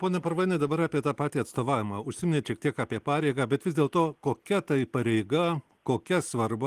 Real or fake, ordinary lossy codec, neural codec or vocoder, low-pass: real; Opus, 24 kbps; none; 14.4 kHz